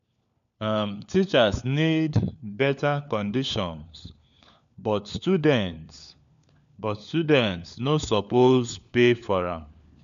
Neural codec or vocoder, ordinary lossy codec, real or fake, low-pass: codec, 16 kHz, 4 kbps, FunCodec, trained on LibriTTS, 50 frames a second; none; fake; 7.2 kHz